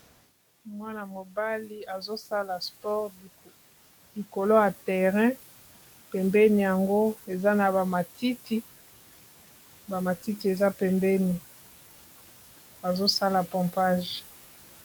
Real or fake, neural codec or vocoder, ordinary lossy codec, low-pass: fake; codec, 44.1 kHz, 7.8 kbps, DAC; MP3, 96 kbps; 19.8 kHz